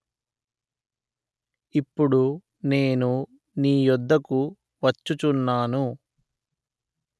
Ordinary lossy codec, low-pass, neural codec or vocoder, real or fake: none; none; none; real